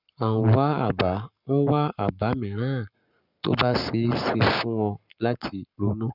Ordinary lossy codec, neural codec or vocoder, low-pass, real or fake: none; codec, 44.1 kHz, 7.8 kbps, Pupu-Codec; 5.4 kHz; fake